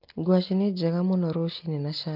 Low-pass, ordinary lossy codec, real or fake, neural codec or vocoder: 5.4 kHz; Opus, 32 kbps; real; none